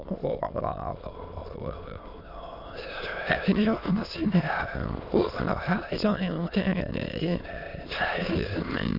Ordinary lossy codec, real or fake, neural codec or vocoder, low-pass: none; fake; autoencoder, 22.05 kHz, a latent of 192 numbers a frame, VITS, trained on many speakers; 5.4 kHz